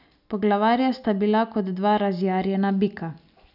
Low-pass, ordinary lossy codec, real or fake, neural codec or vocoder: 5.4 kHz; none; real; none